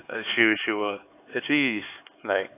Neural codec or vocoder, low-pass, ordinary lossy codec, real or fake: codec, 16 kHz, 4 kbps, X-Codec, HuBERT features, trained on LibriSpeech; 3.6 kHz; AAC, 24 kbps; fake